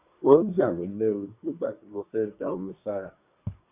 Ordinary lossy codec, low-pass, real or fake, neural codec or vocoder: none; 3.6 kHz; fake; codec, 24 kHz, 1 kbps, SNAC